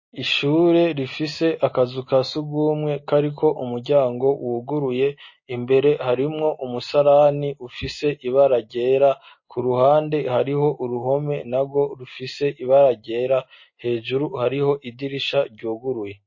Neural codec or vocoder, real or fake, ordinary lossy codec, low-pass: none; real; MP3, 32 kbps; 7.2 kHz